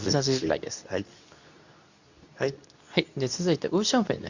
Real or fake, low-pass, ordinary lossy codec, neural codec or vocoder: fake; 7.2 kHz; none; codec, 24 kHz, 0.9 kbps, WavTokenizer, medium speech release version 2